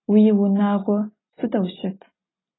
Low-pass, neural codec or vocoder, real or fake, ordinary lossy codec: 7.2 kHz; none; real; AAC, 16 kbps